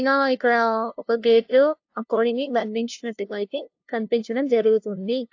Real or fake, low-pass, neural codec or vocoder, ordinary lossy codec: fake; 7.2 kHz; codec, 16 kHz, 0.5 kbps, FunCodec, trained on LibriTTS, 25 frames a second; AAC, 48 kbps